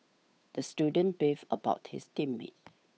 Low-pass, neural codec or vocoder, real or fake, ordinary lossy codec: none; codec, 16 kHz, 8 kbps, FunCodec, trained on Chinese and English, 25 frames a second; fake; none